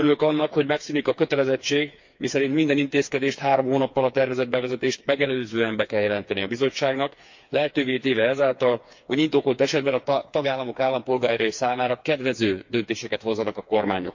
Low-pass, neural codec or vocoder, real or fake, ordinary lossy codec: 7.2 kHz; codec, 16 kHz, 4 kbps, FreqCodec, smaller model; fake; MP3, 48 kbps